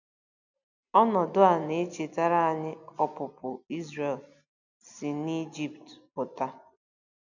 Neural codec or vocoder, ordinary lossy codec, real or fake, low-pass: none; none; real; 7.2 kHz